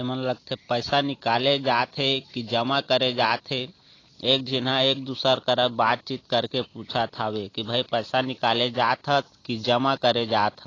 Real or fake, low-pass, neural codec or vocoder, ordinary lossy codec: real; 7.2 kHz; none; AAC, 32 kbps